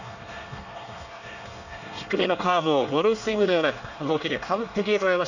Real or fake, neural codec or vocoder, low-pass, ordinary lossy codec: fake; codec, 24 kHz, 1 kbps, SNAC; 7.2 kHz; none